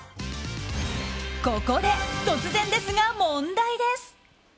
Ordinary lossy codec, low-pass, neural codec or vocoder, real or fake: none; none; none; real